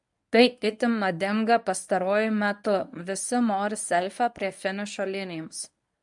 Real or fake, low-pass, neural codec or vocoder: fake; 10.8 kHz; codec, 24 kHz, 0.9 kbps, WavTokenizer, medium speech release version 1